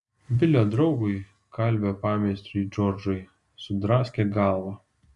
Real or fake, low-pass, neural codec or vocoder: real; 10.8 kHz; none